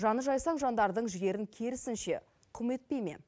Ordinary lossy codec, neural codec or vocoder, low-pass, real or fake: none; none; none; real